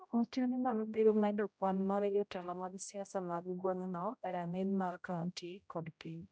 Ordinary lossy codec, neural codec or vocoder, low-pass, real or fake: none; codec, 16 kHz, 0.5 kbps, X-Codec, HuBERT features, trained on general audio; none; fake